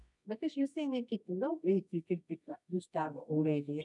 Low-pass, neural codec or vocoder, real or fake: 10.8 kHz; codec, 24 kHz, 0.9 kbps, WavTokenizer, medium music audio release; fake